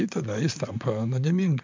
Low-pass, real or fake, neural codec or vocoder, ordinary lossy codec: 7.2 kHz; fake; vocoder, 44.1 kHz, 128 mel bands, Pupu-Vocoder; MP3, 64 kbps